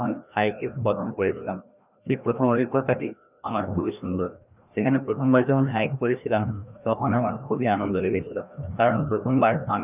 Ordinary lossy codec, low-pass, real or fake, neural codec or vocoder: none; 3.6 kHz; fake; codec, 16 kHz, 1 kbps, FreqCodec, larger model